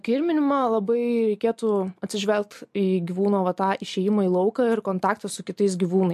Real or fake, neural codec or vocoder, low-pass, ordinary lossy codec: real; none; 14.4 kHz; MP3, 64 kbps